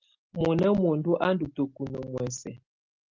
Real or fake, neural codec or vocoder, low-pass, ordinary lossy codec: real; none; 7.2 kHz; Opus, 32 kbps